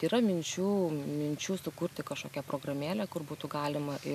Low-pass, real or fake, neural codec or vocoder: 14.4 kHz; real; none